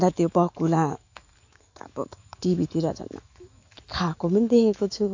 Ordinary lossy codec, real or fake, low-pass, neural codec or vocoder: AAC, 48 kbps; fake; 7.2 kHz; autoencoder, 48 kHz, 128 numbers a frame, DAC-VAE, trained on Japanese speech